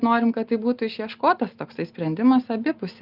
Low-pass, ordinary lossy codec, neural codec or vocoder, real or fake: 5.4 kHz; Opus, 24 kbps; none; real